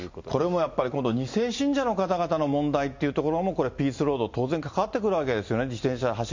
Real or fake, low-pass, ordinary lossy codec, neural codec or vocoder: real; 7.2 kHz; MP3, 48 kbps; none